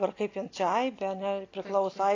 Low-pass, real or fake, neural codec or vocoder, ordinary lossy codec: 7.2 kHz; real; none; AAC, 32 kbps